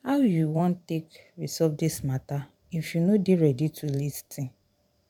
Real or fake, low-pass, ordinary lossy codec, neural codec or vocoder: fake; 19.8 kHz; none; vocoder, 44.1 kHz, 128 mel bands every 512 samples, BigVGAN v2